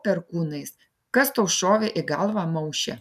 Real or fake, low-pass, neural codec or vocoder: real; 14.4 kHz; none